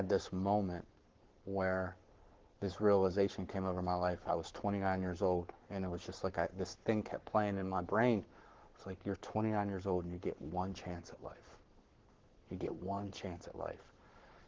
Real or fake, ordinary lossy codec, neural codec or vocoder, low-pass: fake; Opus, 16 kbps; codec, 44.1 kHz, 7.8 kbps, Pupu-Codec; 7.2 kHz